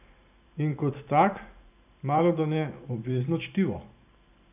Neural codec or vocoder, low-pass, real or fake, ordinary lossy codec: vocoder, 44.1 kHz, 128 mel bands every 512 samples, BigVGAN v2; 3.6 kHz; fake; none